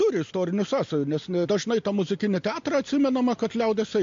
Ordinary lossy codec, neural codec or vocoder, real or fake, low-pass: MP3, 48 kbps; none; real; 7.2 kHz